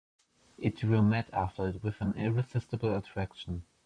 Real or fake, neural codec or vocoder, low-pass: fake; vocoder, 44.1 kHz, 128 mel bands, Pupu-Vocoder; 9.9 kHz